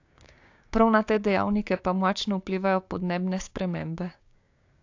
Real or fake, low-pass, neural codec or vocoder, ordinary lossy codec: fake; 7.2 kHz; codec, 16 kHz, 6 kbps, DAC; AAC, 48 kbps